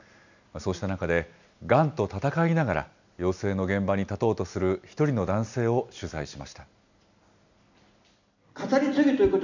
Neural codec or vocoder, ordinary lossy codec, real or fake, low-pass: none; none; real; 7.2 kHz